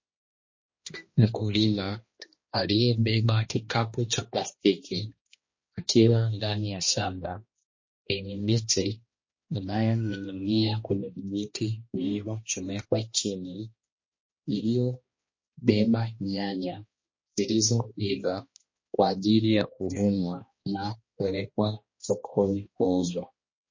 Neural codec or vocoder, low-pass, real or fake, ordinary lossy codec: codec, 16 kHz, 1 kbps, X-Codec, HuBERT features, trained on balanced general audio; 7.2 kHz; fake; MP3, 32 kbps